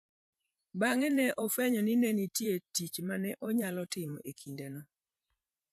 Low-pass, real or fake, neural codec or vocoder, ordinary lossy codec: 14.4 kHz; fake; vocoder, 44.1 kHz, 128 mel bands every 512 samples, BigVGAN v2; none